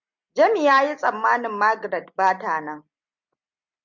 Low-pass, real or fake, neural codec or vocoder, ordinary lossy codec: 7.2 kHz; real; none; MP3, 64 kbps